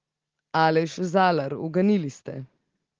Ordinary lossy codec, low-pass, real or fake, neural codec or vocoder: Opus, 16 kbps; 7.2 kHz; real; none